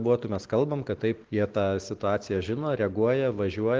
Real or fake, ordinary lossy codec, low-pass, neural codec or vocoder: real; Opus, 16 kbps; 7.2 kHz; none